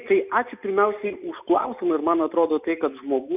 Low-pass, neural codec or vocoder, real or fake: 3.6 kHz; none; real